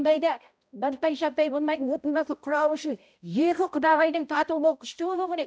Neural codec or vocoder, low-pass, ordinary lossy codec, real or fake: codec, 16 kHz, 0.5 kbps, X-Codec, HuBERT features, trained on balanced general audio; none; none; fake